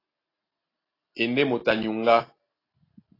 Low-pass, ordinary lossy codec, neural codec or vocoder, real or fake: 5.4 kHz; AAC, 24 kbps; none; real